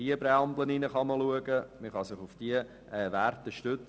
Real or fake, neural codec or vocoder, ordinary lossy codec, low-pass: real; none; none; none